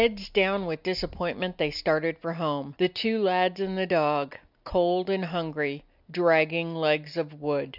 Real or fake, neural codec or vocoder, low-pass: real; none; 5.4 kHz